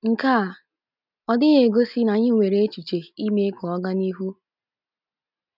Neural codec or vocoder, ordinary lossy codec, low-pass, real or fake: none; none; 5.4 kHz; real